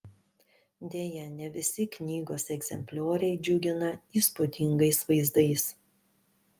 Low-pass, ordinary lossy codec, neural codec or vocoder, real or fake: 14.4 kHz; Opus, 32 kbps; none; real